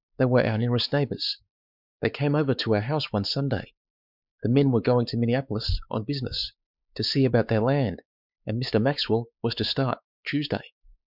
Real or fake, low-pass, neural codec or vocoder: fake; 5.4 kHz; codec, 16 kHz, 4 kbps, X-Codec, WavLM features, trained on Multilingual LibriSpeech